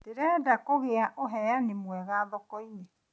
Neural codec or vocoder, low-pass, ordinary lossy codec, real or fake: none; none; none; real